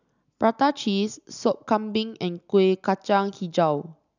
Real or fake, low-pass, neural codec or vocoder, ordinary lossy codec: real; 7.2 kHz; none; none